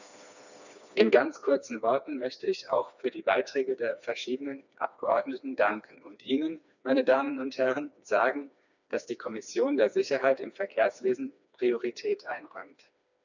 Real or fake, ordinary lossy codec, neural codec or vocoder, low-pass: fake; none; codec, 16 kHz, 2 kbps, FreqCodec, smaller model; 7.2 kHz